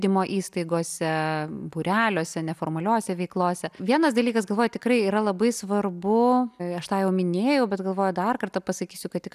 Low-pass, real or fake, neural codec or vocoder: 14.4 kHz; real; none